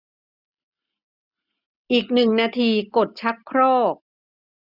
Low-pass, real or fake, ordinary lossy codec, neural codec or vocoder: 5.4 kHz; real; none; none